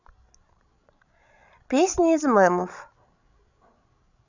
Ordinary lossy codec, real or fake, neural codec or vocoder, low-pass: none; fake; codec, 16 kHz, 16 kbps, FreqCodec, larger model; 7.2 kHz